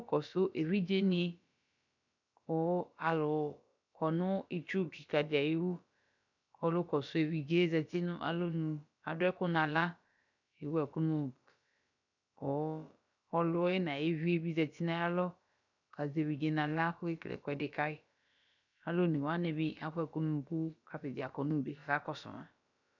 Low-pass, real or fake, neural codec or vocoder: 7.2 kHz; fake; codec, 16 kHz, about 1 kbps, DyCAST, with the encoder's durations